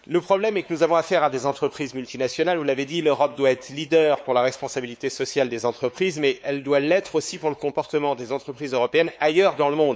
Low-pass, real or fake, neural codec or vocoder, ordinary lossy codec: none; fake; codec, 16 kHz, 4 kbps, X-Codec, WavLM features, trained on Multilingual LibriSpeech; none